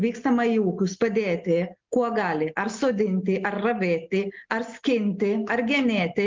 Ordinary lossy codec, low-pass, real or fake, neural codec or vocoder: Opus, 32 kbps; 7.2 kHz; real; none